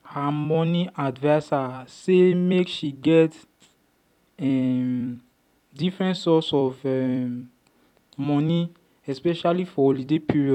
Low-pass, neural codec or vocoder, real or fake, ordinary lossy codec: 19.8 kHz; vocoder, 44.1 kHz, 128 mel bands every 256 samples, BigVGAN v2; fake; none